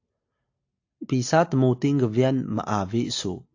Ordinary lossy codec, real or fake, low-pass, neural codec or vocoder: AAC, 48 kbps; real; 7.2 kHz; none